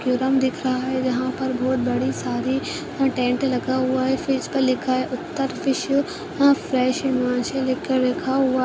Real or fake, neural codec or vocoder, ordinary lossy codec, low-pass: real; none; none; none